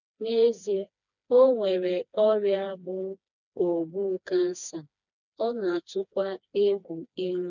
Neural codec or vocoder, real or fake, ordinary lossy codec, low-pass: codec, 16 kHz, 2 kbps, FreqCodec, smaller model; fake; none; 7.2 kHz